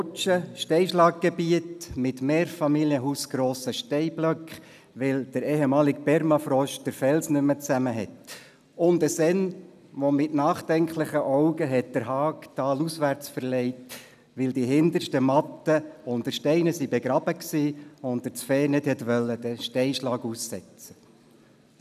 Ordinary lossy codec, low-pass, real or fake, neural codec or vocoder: none; 14.4 kHz; real; none